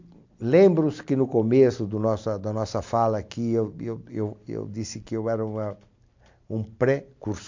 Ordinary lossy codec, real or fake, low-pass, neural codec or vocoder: MP3, 64 kbps; real; 7.2 kHz; none